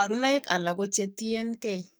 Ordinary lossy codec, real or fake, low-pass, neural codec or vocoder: none; fake; none; codec, 44.1 kHz, 2.6 kbps, SNAC